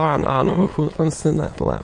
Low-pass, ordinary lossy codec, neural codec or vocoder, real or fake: 9.9 kHz; MP3, 48 kbps; autoencoder, 22.05 kHz, a latent of 192 numbers a frame, VITS, trained on many speakers; fake